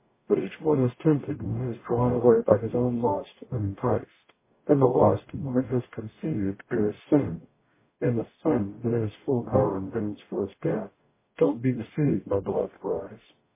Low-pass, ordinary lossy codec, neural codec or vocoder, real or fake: 3.6 kHz; MP3, 16 kbps; codec, 44.1 kHz, 0.9 kbps, DAC; fake